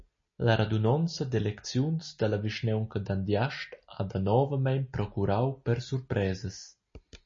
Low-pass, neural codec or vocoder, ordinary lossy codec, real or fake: 7.2 kHz; none; MP3, 32 kbps; real